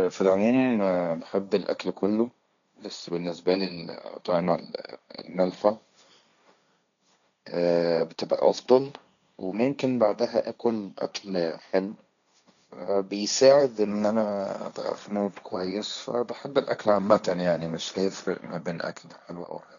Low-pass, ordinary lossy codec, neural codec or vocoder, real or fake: 7.2 kHz; none; codec, 16 kHz, 1.1 kbps, Voila-Tokenizer; fake